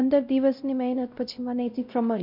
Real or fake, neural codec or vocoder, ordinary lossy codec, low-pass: fake; codec, 16 kHz, 0.5 kbps, X-Codec, WavLM features, trained on Multilingual LibriSpeech; none; 5.4 kHz